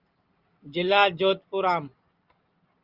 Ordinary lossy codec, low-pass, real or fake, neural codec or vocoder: Opus, 32 kbps; 5.4 kHz; real; none